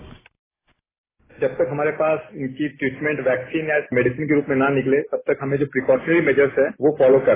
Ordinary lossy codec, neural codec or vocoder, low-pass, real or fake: AAC, 16 kbps; none; 3.6 kHz; real